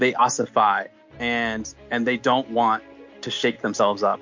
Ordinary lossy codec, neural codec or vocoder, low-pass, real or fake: MP3, 48 kbps; none; 7.2 kHz; real